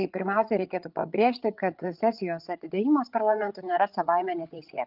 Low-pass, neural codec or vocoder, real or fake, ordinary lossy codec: 5.4 kHz; codec, 16 kHz, 8 kbps, FreqCodec, larger model; fake; Opus, 32 kbps